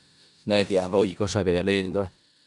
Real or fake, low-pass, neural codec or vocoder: fake; 10.8 kHz; codec, 16 kHz in and 24 kHz out, 0.4 kbps, LongCat-Audio-Codec, four codebook decoder